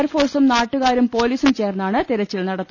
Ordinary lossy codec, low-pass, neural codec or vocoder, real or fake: none; 7.2 kHz; none; real